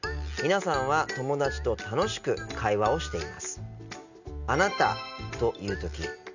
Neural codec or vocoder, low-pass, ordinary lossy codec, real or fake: none; 7.2 kHz; none; real